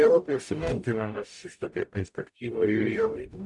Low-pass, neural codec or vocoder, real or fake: 10.8 kHz; codec, 44.1 kHz, 0.9 kbps, DAC; fake